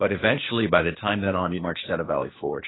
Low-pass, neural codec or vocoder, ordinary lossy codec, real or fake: 7.2 kHz; codec, 16 kHz, 0.8 kbps, ZipCodec; AAC, 16 kbps; fake